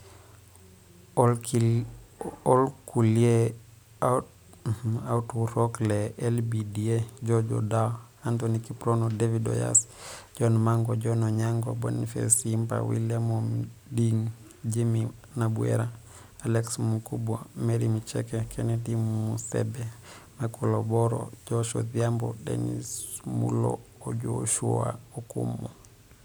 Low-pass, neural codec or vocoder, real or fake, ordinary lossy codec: none; none; real; none